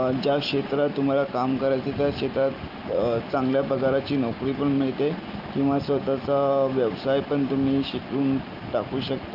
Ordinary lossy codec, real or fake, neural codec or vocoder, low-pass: Opus, 24 kbps; real; none; 5.4 kHz